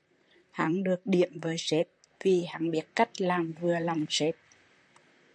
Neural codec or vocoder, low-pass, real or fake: vocoder, 44.1 kHz, 128 mel bands, Pupu-Vocoder; 9.9 kHz; fake